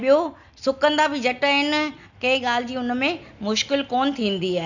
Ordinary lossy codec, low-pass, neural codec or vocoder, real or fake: none; 7.2 kHz; none; real